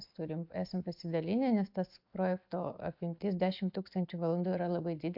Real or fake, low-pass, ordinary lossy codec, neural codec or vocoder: real; 5.4 kHz; MP3, 48 kbps; none